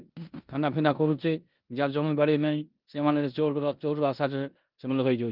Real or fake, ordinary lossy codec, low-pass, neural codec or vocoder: fake; Opus, 32 kbps; 5.4 kHz; codec, 16 kHz in and 24 kHz out, 0.9 kbps, LongCat-Audio-Codec, four codebook decoder